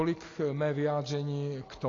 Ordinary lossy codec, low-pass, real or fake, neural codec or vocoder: AAC, 32 kbps; 7.2 kHz; real; none